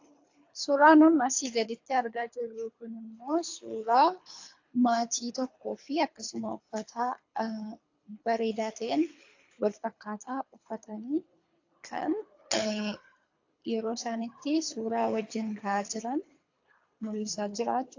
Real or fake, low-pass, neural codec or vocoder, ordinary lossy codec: fake; 7.2 kHz; codec, 24 kHz, 3 kbps, HILCodec; AAC, 48 kbps